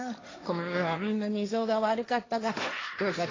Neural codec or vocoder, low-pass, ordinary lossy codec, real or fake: codec, 16 kHz, 1.1 kbps, Voila-Tokenizer; 7.2 kHz; AAC, 32 kbps; fake